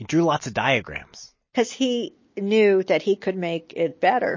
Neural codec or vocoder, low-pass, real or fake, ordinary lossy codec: none; 7.2 kHz; real; MP3, 32 kbps